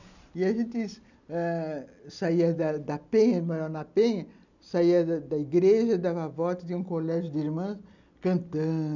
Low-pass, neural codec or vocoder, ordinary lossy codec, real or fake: 7.2 kHz; none; none; real